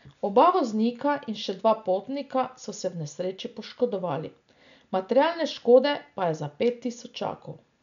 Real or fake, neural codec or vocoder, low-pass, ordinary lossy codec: real; none; 7.2 kHz; none